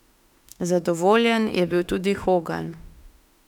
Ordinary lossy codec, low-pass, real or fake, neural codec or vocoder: none; 19.8 kHz; fake; autoencoder, 48 kHz, 32 numbers a frame, DAC-VAE, trained on Japanese speech